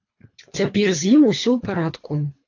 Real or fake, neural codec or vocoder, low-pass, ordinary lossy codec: fake; codec, 24 kHz, 3 kbps, HILCodec; 7.2 kHz; AAC, 48 kbps